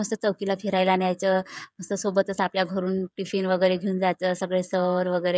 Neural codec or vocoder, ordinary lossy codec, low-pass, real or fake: codec, 16 kHz, 16 kbps, FreqCodec, smaller model; none; none; fake